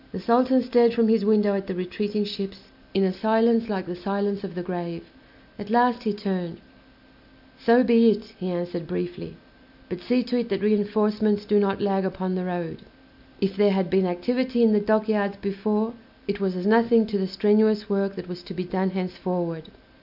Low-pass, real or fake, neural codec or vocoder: 5.4 kHz; real; none